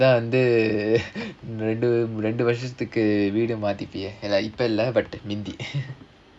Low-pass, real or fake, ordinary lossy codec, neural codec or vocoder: none; real; none; none